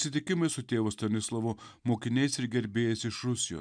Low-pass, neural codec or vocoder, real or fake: 9.9 kHz; none; real